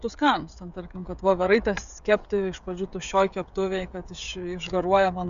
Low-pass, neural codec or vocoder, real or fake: 7.2 kHz; codec, 16 kHz, 16 kbps, FreqCodec, smaller model; fake